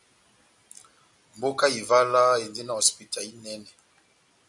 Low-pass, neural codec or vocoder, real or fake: 10.8 kHz; none; real